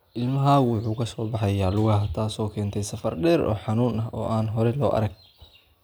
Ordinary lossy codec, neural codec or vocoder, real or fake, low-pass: none; none; real; none